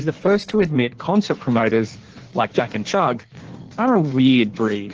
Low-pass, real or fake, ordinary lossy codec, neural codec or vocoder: 7.2 kHz; fake; Opus, 16 kbps; codec, 16 kHz in and 24 kHz out, 1.1 kbps, FireRedTTS-2 codec